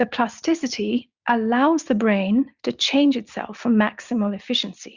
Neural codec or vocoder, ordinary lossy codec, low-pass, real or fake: none; Opus, 64 kbps; 7.2 kHz; real